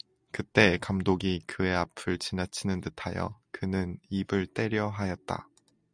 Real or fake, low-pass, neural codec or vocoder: real; 9.9 kHz; none